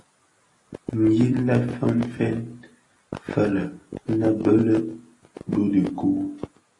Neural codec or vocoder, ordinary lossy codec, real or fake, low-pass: none; AAC, 32 kbps; real; 10.8 kHz